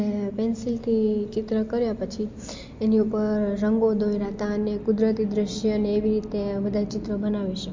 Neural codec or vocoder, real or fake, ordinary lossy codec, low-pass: none; real; MP3, 48 kbps; 7.2 kHz